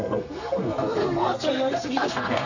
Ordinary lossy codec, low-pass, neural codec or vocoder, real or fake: none; 7.2 kHz; codec, 32 kHz, 1.9 kbps, SNAC; fake